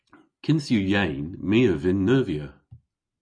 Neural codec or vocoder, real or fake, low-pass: none; real; 9.9 kHz